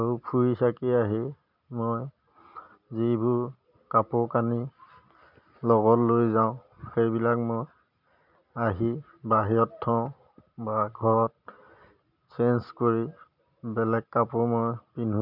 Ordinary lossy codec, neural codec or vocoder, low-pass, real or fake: none; none; 5.4 kHz; real